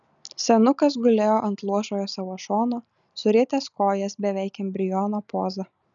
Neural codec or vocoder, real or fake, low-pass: none; real; 7.2 kHz